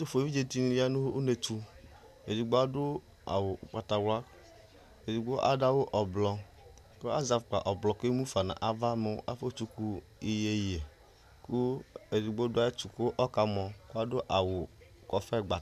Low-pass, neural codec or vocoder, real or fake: 14.4 kHz; none; real